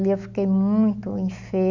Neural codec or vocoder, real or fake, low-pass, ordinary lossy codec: none; real; 7.2 kHz; none